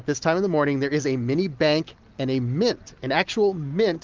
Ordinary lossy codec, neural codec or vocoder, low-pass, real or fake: Opus, 24 kbps; none; 7.2 kHz; real